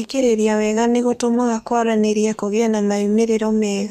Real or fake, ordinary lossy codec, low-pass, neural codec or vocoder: fake; none; 14.4 kHz; codec, 32 kHz, 1.9 kbps, SNAC